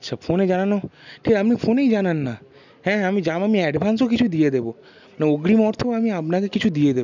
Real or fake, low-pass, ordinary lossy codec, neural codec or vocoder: real; 7.2 kHz; none; none